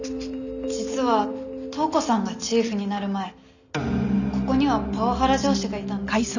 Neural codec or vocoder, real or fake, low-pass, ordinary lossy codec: none; real; 7.2 kHz; none